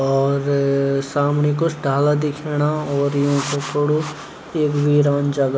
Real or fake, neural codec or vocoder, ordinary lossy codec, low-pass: real; none; none; none